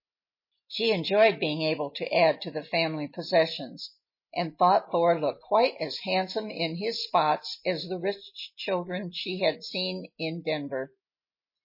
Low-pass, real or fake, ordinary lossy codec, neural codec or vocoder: 5.4 kHz; real; MP3, 24 kbps; none